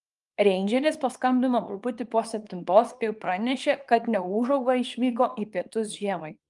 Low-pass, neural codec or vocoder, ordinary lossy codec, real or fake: 10.8 kHz; codec, 24 kHz, 0.9 kbps, WavTokenizer, small release; Opus, 32 kbps; fake